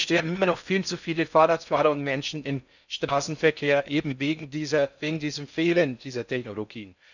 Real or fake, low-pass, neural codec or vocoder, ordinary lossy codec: fake; 7.2 kHz; codec, 16 kHz in and 24 kHz out, 0.6 kbps, FocalCodec, streaming, 4096 codes; none